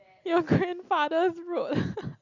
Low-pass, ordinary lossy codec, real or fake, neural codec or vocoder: 7.2 kHz; Opus, 64 kbps; real; none